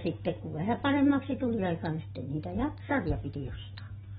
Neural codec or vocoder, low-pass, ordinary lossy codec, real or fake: none; 7.2 kHz; AAC, 16 kbps; real